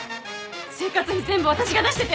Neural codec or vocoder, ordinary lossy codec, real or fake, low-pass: none; none; real; none